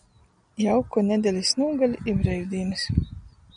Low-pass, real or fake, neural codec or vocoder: 9.9 kHz; real; none